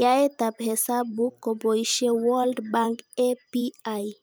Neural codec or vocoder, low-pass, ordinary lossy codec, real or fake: vocoder, 44.1 kHz, 128 mel bands every 512 samples, BigVGAN v2; none; none; fake